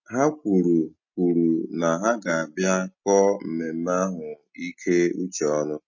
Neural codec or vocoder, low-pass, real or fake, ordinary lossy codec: none; 7.2 kHz; real; MP3, 32 kbps